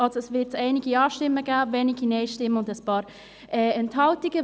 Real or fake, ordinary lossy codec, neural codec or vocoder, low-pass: real; none; none; none